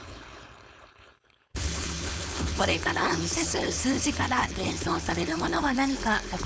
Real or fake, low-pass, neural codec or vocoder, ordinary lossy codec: fake; none; codec, 16 kHz, 4.8 kbps, FACodec; none